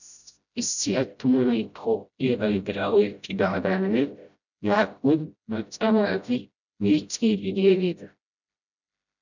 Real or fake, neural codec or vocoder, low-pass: fake; codec, 16 kHz, 0.5 kbps, FreqCodec, smaller model; 7.2 kHz